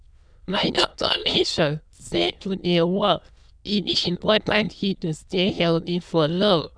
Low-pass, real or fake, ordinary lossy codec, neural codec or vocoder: 9.9 kHz; fake; none; autoencoder, 22.05 kHz, a latent of 192 numbers a frame, VITS, trained on many speakers